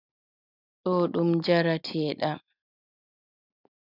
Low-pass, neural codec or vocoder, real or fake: 5.4 kHz; none; real